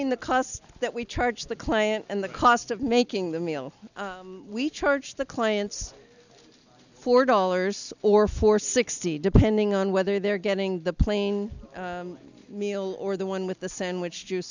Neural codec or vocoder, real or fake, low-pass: none; real; 7.2 kHz